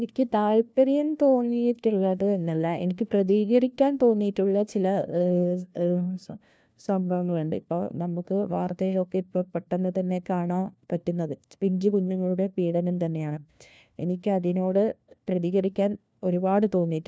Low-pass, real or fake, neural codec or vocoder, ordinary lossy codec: none; fake; codec, 16 kHz, 1 kbps, FunCodec, trained on LibriTTS, 50 frames a second; none